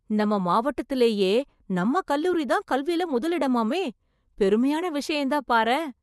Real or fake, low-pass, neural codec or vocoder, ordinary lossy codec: fake; none; vocoder, 24 kHz, 100 mel bands, Vocos; none